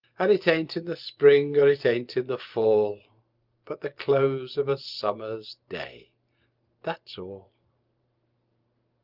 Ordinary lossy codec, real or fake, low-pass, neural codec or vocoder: Opus, 24 kbps; fake; 5.4 kHz; vocoder, 44.1 kHz, 128 mel bands every 512 samples, BigVGAN v2